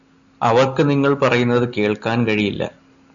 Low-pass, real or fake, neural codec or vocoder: 7.2 kHz; real; none